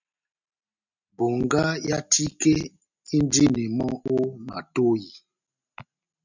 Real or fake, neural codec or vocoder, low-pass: real; none; 7.2 kHz